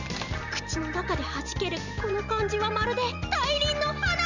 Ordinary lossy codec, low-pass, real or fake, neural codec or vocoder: none; 7.2 kHz; real; none